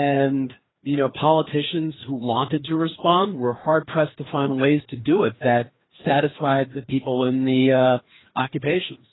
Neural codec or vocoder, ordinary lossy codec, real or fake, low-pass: codec, 16 kHz, 2 kbps, FreqCodec, larger model; AAC, 16 kbps; fake; 7.2 kHz